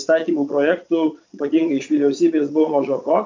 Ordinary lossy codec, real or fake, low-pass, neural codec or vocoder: MP3, 64 kbps; fake; 7.2 kHz; vocoder, 22.05 kHz, 80 mel bands, Vocos